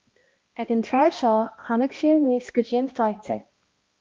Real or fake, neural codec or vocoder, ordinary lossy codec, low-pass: fake; codec, 16 kHz, 1 kbps, X-Codec, HuBERT features, trained on balanced general audio; Opus, 32 kbps; 7.2 kHz